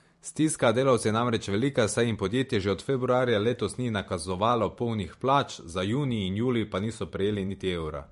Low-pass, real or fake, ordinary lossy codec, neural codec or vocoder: 14.4 kHz; fake; MP3, 48 kbps; vocoder, 44.1 kHz, 128 mel bands every 512 samples, BigVGAN v2